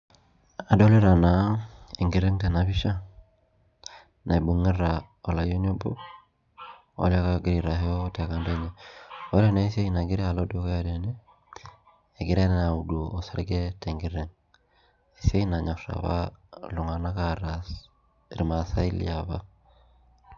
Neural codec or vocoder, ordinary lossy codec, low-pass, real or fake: none; none; 7.2 kHz; real